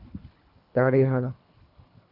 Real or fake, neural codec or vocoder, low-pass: fake; codec, 24 kHz, 3 kbps, HILCodec; 5.4 kHz